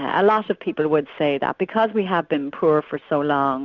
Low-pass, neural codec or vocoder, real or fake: 7.2 kHz; none; real